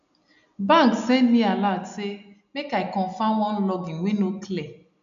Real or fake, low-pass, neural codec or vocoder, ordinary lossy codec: real; 7.2 kHz; none; none